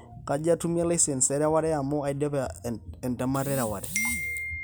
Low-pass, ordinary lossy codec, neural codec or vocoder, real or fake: none; none; none; real